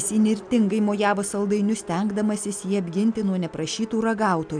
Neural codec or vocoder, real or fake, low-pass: none; real; 9.9 kHz